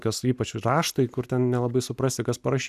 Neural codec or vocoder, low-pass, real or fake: none; 14.4 kHz; real